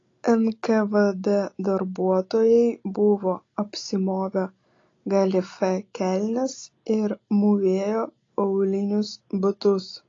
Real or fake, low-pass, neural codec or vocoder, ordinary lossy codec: real; 7.2 kHz; none; AAC, 32 kbps